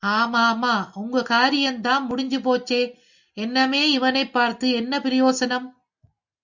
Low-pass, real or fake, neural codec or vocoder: 7.2 kHz; real; none